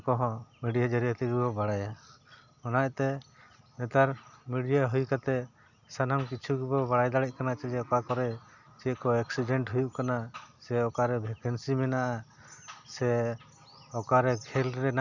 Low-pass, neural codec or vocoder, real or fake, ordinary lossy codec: 7.2 kHz; none; real; none